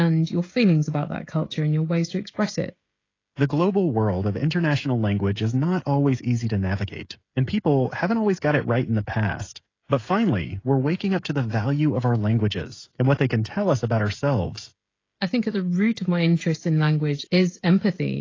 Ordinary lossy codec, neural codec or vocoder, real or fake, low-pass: AAC, 32 kbps; codec, 16 kHz, 16 kbps, FreqCodec, smaller model; fake; 7.2 kHz